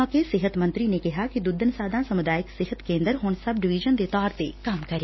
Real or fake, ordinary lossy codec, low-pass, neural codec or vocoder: real; MP3, 24 kbps; 7.2 kHz; none